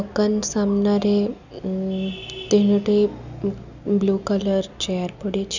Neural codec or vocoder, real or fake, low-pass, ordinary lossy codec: none; real; 7.2 kHz; none